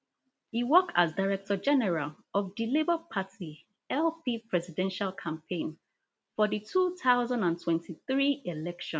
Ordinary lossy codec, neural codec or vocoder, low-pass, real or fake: none; none; none; real